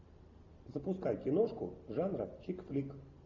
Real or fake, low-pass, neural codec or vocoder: real; 7.2 kHz; none